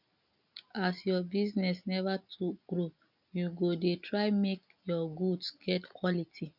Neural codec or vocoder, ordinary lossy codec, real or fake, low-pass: none; none; real; 5.4 kHz